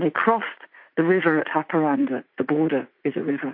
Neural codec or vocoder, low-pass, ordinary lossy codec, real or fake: vocoder, 44.1 kHz, 80 mel bands, Vocos; 5.4 kHz; MP3, 32 kbps; fake